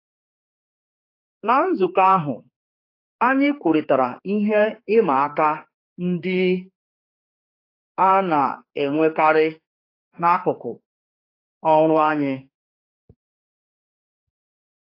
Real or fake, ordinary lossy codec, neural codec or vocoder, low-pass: fake; AAC, 32 kbps; codec, 16 kHz, 4 kbps, X-Codec, HuBERT features, trained on general audio; 5.4 kHz